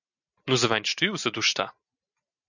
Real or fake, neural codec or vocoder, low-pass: real; none; 7.2 kHz